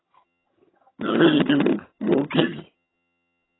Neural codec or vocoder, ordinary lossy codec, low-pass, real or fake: vocoder, 22.05 kHz, 80 mel bands, HiFi-GAN; AAC, 16 kbps; 7.2 kHz; fake